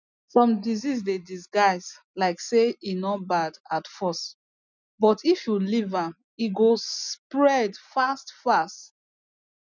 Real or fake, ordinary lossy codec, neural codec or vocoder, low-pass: real; none; none; 7.2 kHz